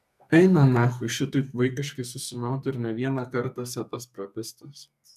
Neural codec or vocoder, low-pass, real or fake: codec, 44.1 kHz, 2.6 kbps, SNAC; 14.4 kHz; fake